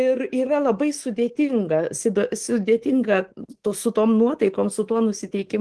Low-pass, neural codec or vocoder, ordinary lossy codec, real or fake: 10.8 kHz; codec, 24 kHz, 3.1 kbps, DualCodec; Opus, 16 kbps; fake